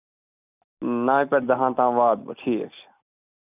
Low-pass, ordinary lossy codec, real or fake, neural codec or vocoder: 3.6 kHz; none; real; none